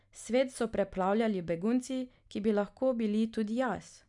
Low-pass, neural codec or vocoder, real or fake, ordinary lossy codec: 10.8 kHz; none; real; none